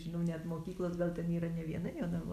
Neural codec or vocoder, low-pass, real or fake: none; 14.4 kHz; real